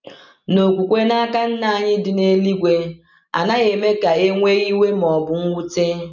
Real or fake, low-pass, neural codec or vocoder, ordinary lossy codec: real; 7.2 kHz; none; none